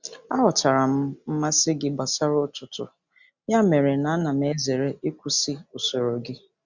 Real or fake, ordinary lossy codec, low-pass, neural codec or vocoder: real; Opus, 64 kbps; 7.2 kHz; none